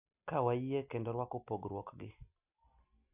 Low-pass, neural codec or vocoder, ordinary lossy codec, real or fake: 3.6 kHz; none; none; real